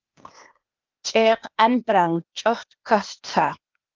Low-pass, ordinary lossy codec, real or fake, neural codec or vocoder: 7.2 kHz; Opus, 24 kbps; fake; codec, 16 kHz, 0.8 kbps, ZipCodec